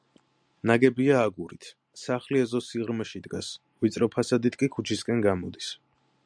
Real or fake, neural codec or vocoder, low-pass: real; none; 9.9 kHz